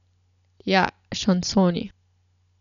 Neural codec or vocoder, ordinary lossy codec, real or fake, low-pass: none; none; real; 7.2 kHz